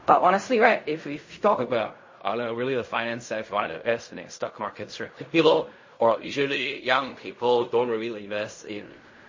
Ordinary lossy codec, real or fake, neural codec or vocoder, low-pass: MP3, 32 kbps; fake; codec, 16 kHz in and 24 kHz out, 0.4 kbps, LongCat-Audio-Codec, fine tuned four codebook decoder; 7.2 kHz